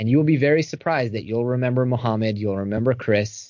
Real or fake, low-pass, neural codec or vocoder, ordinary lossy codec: real; 7.2 kHz; none; MP3, 48 kbps